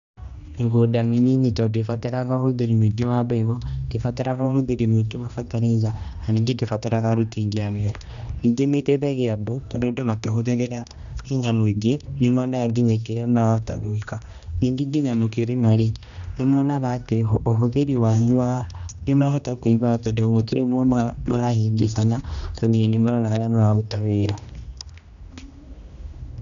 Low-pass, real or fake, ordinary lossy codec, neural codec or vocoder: 7.2 kHz; fake; MP3, 96 kbps; codec, 16 kHz, 1 kbps, X-Codec, HuBERT features, trained on general audio